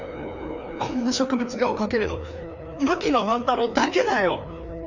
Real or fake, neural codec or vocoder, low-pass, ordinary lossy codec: fake; codec, 16 kHz, 2 kbps, FreqCodec, larger model; 7.2 kHz; none